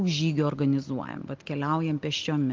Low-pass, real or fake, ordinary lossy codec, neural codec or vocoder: 7.2 kHz; real; Opus, 32 kbps; none